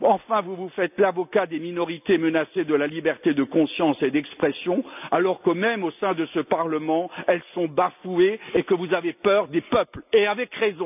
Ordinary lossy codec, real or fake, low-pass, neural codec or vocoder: none; real; 3.6 kHz; none